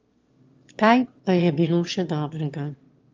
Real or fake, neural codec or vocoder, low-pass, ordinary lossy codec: fake; autoencoder, 22.05 kHz, a latent of 192 numbers a frame, VITS, trained on one speaker; 7.2 kHz; Opus, 32 kbps